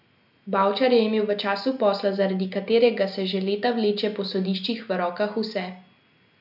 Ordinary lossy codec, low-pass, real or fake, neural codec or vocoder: none; 5.4 kHz; real; none